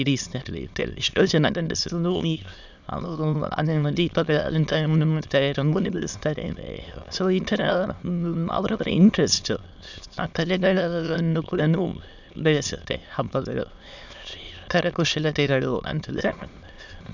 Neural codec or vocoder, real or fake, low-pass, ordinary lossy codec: autoencoder, 22.05 kHz, a latent of 192 numbers a frame, VITS, trained on many speakers; fake; 7.2 kHz; none